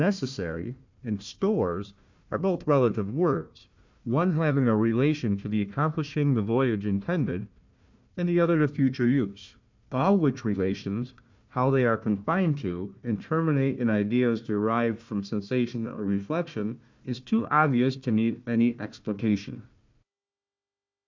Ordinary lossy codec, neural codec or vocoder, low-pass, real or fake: MP3, 64 kbps; codec, 16 kHz, 1 kbps, FunCodec, trained on Chinese and English, 50 frames a second; 7.2 kHz; fake